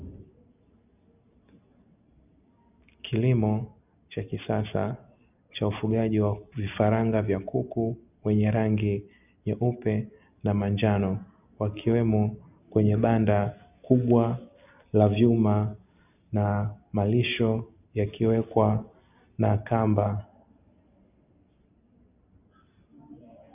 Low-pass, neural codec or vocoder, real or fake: 3.6 kHz; none; real